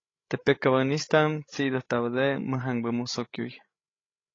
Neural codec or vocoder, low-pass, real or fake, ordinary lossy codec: codec, 16 kHz, 16 kbps, FreqCodec, larger model; 7.2 kHz; fake; AAC, 32 kbps